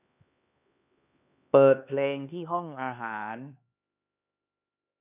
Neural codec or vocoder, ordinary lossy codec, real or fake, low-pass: codec, 16 kHz, 2 kbps, X-Codec, HuBERT features, trained on LibriSpeech; none; fake; 3.6 kHz